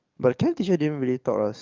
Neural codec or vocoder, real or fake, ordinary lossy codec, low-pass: codec, 44.1 kHz, 7.8 kbps, DAC; fake; Opus, 24 kbps; 7.2 kHz